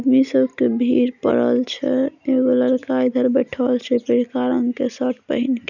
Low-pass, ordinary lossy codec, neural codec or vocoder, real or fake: 7.2 kHz; none; none; real